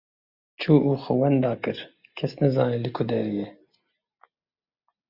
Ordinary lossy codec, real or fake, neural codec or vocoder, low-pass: Opus, 64 kbps; real; none; 5.4 kHz